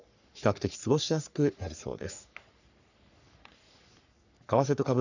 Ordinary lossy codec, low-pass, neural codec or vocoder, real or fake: none; 7.2 kHz; codec, 44.1 kHz, 3.4 kbps, Pupu-Codec; fake